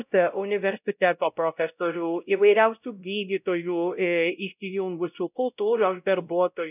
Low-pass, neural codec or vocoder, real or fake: 3.6 kHz; codec, 16 kHz, 0.5 kbps, X-Codec, WavLM features, trained on Multilingual LibriSpeech; fake